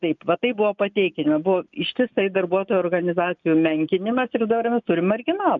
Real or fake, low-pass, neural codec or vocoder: real; 7.2 kHz; none